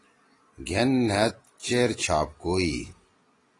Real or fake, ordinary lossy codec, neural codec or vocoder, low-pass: real; AAC, 32 kbps; none; 10.8 kHz